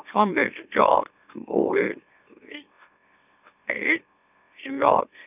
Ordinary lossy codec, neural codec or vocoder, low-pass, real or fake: none; autoencoder, 44.1 kHz, a latent of 192 numbers a frame, MeloTTS; 3.6 kHz; fake